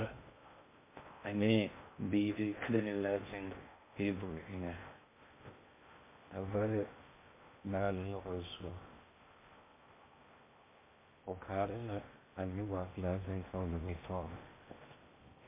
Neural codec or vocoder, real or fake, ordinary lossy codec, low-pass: codec, 16 kHz in and 24 kHz out, 0.6 kbps, FocalCodec, streaming, 2048 codes; fake; MP3, 24 kbps; 3.6 kHz